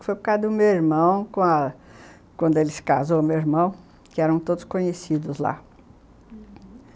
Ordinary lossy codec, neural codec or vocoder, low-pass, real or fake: none; none; none; real